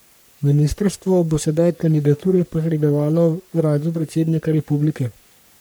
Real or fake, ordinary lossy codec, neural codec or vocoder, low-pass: fake; none; codec, 44.1 kHz, 3.4 kbps, Pupu-Codec; none